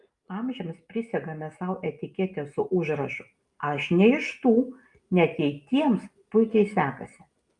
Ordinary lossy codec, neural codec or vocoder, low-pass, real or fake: Opus, 32 kbps; none; 10.8 kHz; real